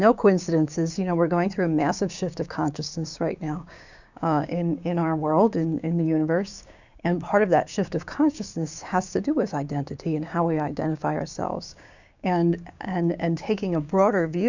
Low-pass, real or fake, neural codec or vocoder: 7.2 kHz; fake; codec, 16 kHz, 6 kbps, DAC